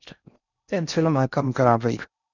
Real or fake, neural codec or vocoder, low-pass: fake; codec, 16 kHz in and 24 kHz out, 0.6 kbps, FocalCodec, streaming, 4096 codes; 7.2 kHz